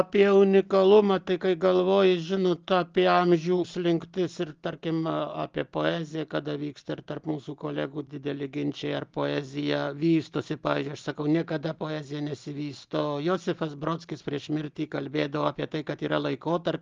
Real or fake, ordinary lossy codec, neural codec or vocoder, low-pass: real; Opus, 32 kbps; none; 7.2 kHz